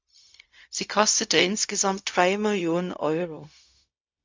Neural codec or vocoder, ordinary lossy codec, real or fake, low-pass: codec, 16 kHz, 0.4 kbps, LongCat-Audio-Codec; MP3, 64 kbps; fake; 7.2 kHz